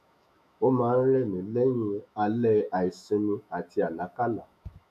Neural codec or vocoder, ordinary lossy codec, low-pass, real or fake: autoencoder, 48 kHz, 128 numbers a frame, DAC-VAE, trained on Japanese speech; none; 14.4 kHz; fake